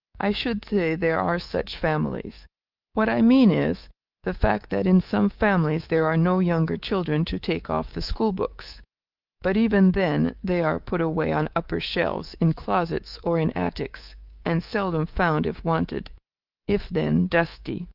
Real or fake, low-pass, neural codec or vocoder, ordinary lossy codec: fake; 5.4 kHz; codec, 24 kHz, 3.1 kbps, DualCodec; Opus, 32 kbps